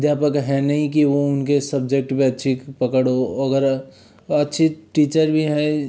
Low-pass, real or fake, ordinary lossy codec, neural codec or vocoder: none; real; none; none